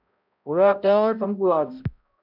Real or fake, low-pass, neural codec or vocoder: fake; 5.4 kHz; codec, 16 kHz, 0.5 kbps, X-Codec, HuBERT features, trained on balanced general audio